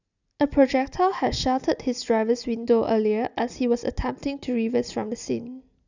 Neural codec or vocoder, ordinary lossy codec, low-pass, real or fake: none; none; 7.2 kHz; real